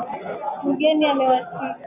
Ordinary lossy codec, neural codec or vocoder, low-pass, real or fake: MP3, 32 kbps; none; 3.6 kHz; real